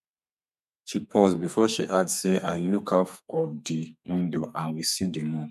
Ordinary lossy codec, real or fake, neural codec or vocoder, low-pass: none; fake; codec, 32 kHz, 1.9 kbps, SNAC; 14.4 kHz